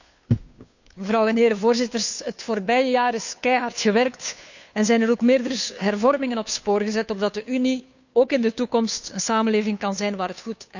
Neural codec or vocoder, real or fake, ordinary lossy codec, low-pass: codec, 16 kHz, 2 kbps, FunCodec, trained on Chinese and English, 25 frames a second; fake; none; 7.2 kHz